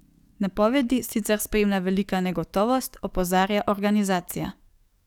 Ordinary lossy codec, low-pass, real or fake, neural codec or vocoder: none; 19.8 kHz; fake; codec, 44.1 kHz, 7.8 kbps, DAC